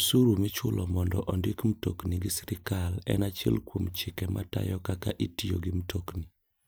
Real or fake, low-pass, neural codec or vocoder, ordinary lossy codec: real; none; none; none